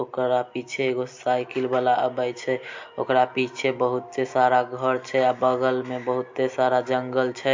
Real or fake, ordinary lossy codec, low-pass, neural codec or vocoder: real; MP3, 48 kbps; 7.2 kHz; none